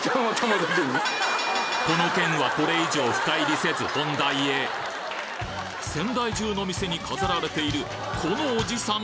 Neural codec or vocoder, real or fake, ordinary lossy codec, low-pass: none; real; none; none